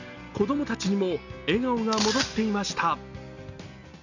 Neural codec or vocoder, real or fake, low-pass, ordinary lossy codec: none; real; 7.2 kHz; none